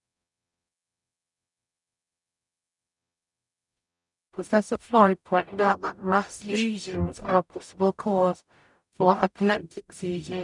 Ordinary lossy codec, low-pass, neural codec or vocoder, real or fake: none; 10.8 kHz; codec, 44.1 kHz, 0.9 kbps, DAC; fake